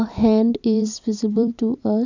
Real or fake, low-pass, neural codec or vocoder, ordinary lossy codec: fake; 7.2 kHz; vocoder, 44.1 kHz, 128 mel bands every 256 samples, BigVGAN v2; none